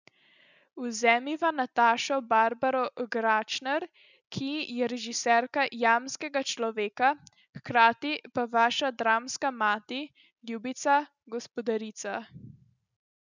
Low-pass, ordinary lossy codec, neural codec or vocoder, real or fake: 7.2 kHz; none; none; real